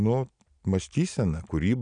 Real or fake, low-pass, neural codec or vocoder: real; 9.9 kHz; none